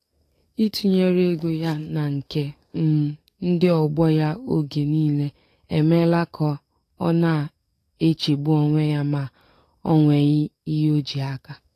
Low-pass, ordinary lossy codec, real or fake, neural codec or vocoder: 14.4 kHz; AAC, 48 kbps; fake; codec, 44.1 kHz, 7.8 kbps, DAC